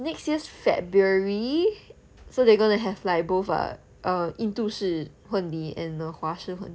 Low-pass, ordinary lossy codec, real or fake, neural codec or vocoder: none; none; real; none